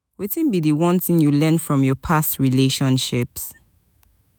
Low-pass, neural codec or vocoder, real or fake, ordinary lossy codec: none; autoencoder, 48 kHz, 128 numbers a frame, DAC-VAE, trained on Japanese speech; fake; none